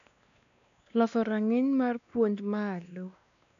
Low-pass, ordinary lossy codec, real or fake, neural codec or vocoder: 7.2 kHz; none; fake; codec, 16 kHz, 2 kbps, X-Codec, WavLM features, trained on Multilingual LibriSpeech